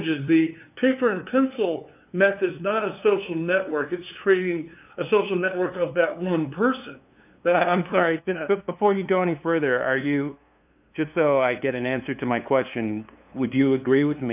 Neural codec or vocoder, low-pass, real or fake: codec, 16 kHz, 2 kbps, FunCodec, trained on LibriTTS, 25 frames a second; 3.6 kHz; fake